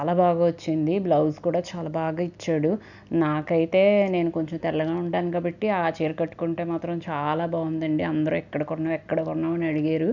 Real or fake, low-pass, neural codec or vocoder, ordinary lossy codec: real; 7.2 kHz; none; none